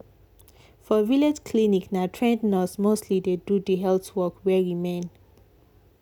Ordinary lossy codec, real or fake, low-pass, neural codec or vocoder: none; real; 19.8 kHz; none